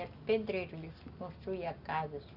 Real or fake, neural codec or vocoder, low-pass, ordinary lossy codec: real; none; 5.4 kHz; Opus, 64 kbps